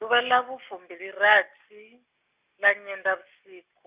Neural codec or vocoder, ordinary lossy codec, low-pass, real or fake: none; Opus, 64 kbps; 3.6 kHz; real